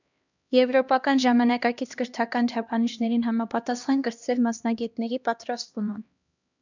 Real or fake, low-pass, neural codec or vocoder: fake; 7.2 kHz; codec, 16 kHz, 1 kbps, X-Codec, HuBERT features, trained on LibriSpeech